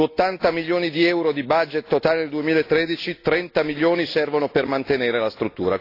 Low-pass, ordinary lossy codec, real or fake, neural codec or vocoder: 5.4 kHz; AAC, 32 kbps; real; none